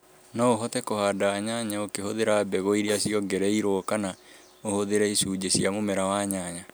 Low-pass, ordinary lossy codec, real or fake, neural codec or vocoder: none; none; real; none